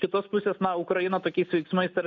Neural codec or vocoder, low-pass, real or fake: none; 7.2 kHz; real